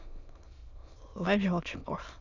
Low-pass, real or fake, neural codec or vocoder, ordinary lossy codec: 7.2 kHz; fake; autoencoder, 22.05 kHz, a latent of 192 numbers a frame, VITS, trained on many speakers; none